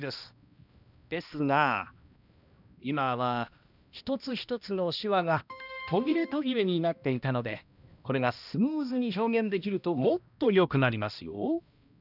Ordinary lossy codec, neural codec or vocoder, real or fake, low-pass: none; codec, 16 kHz, 1 kbps, X-Codec, HuBERT features, trained on balanced general audio; fake; 5.4 kHz